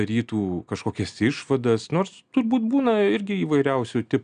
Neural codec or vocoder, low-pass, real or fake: none; 9.9 kHz; real